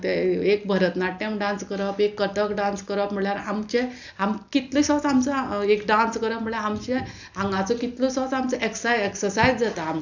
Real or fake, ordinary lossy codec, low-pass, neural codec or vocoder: real; none; 7.2 kHz; none